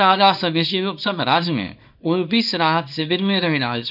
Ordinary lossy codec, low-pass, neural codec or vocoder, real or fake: none; 5.4 kHz; codec, 24 kHz, 0.9 kbps, WavTokenizer, small release; fake